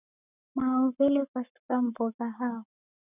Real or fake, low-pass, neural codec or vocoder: fake; 3.6 kHz; codec, 44.1 kHz, 7.8 kbps, Pupu-Codec